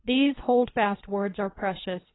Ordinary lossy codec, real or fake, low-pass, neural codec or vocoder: AAC, 16 kbps; fake; 7.2 kHz; codec, 24 kHz, 3 kbps, HILCodec